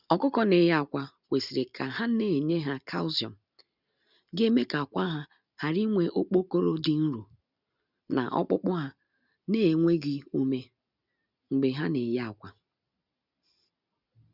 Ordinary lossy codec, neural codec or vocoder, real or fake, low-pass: none; none; real; 5.4 kHz